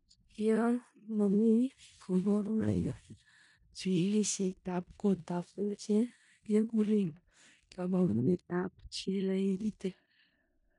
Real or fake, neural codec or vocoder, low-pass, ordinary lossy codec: fake; codec, 16 kHz in and 24 kHz out, 0.4 kbps, LongCat-Audio-Codec, four codebook decoder; 10.8 kHz; none